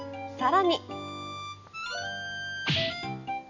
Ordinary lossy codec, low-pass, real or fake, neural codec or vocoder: none; 7.2 kHz; real; none